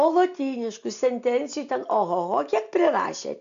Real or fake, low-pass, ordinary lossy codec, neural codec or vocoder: real; 7.2 kHz; MP3, 64 kbps; none